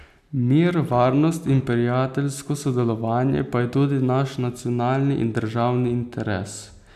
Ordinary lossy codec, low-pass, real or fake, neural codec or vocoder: none; 14.4 kHz; real; none